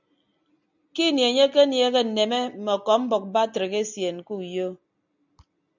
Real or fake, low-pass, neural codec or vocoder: real; 7.2 kHz; none